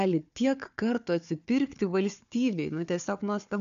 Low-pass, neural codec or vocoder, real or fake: 7.2 kHz; codec, 16 kHz, 4 kbps, FunCodec, trained on Chinese and English, 50 frames a second; fake